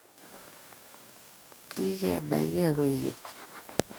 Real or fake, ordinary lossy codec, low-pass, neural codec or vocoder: fake; none; none; codec, 44.1 kHz, 2.6 kbps, DAC